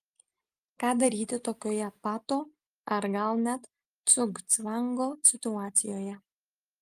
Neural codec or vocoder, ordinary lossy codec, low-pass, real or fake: none; Opus, 32 kbps; 14.4 kHz; real